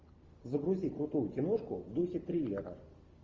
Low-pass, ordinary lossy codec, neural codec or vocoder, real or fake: 7.2 kHz; Opus, 32 kbps; none; real